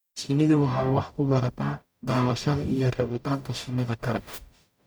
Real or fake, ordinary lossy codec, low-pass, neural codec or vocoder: fake; none; none; codec, 44.1 kHz, 0.9 kbps, DAC